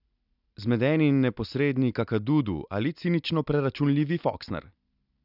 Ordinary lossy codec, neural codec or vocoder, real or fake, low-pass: none; none; real; 5.4 kHz